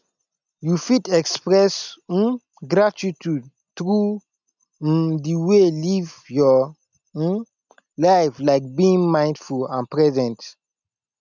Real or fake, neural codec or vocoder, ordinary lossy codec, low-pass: real; none; none; 7.2 kHz